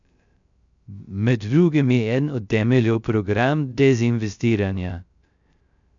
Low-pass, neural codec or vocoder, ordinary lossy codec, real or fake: 7.2 kHz; codec, 16 kHz, 0.3 kbps, FocalCodec; none; fake